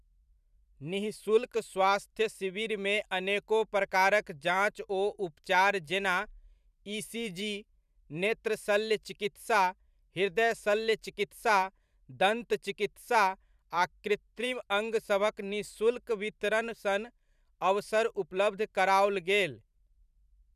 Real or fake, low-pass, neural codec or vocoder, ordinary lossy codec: fake; 14.4 kHz; vocoder, 44.1 kHz, 128 mel bands every 512 samples, BigVGAN v2; none